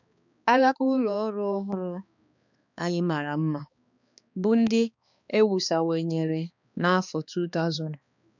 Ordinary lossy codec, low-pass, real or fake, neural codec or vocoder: none; 7.2 kHz; fake; codec, 16 kHz, 2 kbps, X-Codec, HuBERT features, trained on balanced general audio